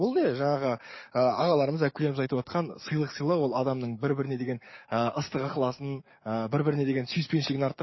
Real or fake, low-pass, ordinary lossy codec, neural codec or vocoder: fake; 7.2 kHz; MP3, 24 kbps; codec, 44.1 kHz, 7.8 kbps, DAC